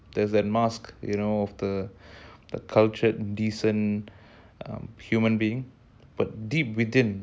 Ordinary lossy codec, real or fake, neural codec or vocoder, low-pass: none; real; none; none